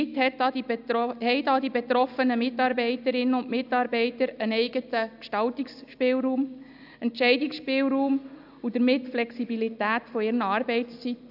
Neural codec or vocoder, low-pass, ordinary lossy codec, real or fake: none; 5.4 kHz; AAC, 48 kbps; real